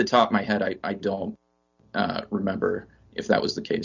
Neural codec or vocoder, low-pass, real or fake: none; 7.2 kHz; real